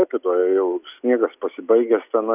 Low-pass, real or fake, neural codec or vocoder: 3.6 kHz; real; none